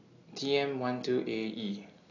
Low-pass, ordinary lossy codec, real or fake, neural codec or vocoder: 7.2 kHz; AAC, 32 kbps; real; none